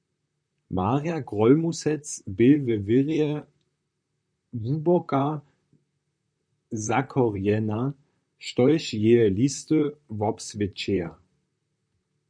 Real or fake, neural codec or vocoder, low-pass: fake; vocoder, 44.1 kHz, 128 mel bands, Pupu-Vocoder; 9.9 kHz